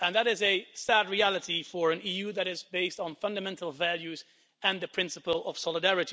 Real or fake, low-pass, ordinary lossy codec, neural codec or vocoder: real; none; none; none